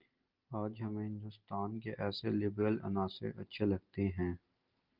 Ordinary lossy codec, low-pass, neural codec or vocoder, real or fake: Opus, 32 kbps; 5.4 kHz; none; real